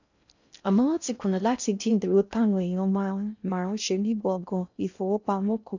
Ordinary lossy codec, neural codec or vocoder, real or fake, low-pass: none; codec, 16 kHz in and 24 kHz out, 0.6 kbps, FocalCodec, streaming, 4096 codes; fake; 7.2 kHz